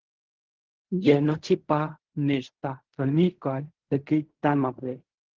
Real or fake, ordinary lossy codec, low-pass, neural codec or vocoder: fake; Opus, 16 kbps; 7.2 kHz; codec, 16 kHz in and 24 kHz out, 0.4 kbps, LongCat-Audio-Codec, fine tuned four codebook decoder